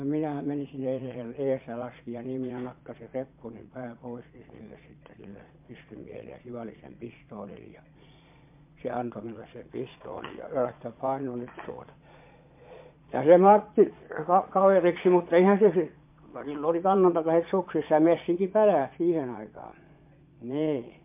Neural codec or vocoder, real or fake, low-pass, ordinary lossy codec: vocoder, 22.05 kHz, 80 mel bands, Vocos; fake; 3.6 kHz; MP3, 32 kbps